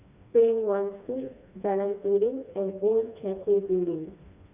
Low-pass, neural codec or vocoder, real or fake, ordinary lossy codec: 3.6 kHz; codec, 16 kHz, 2 kbps, FreqCodec, smaller model; fake; none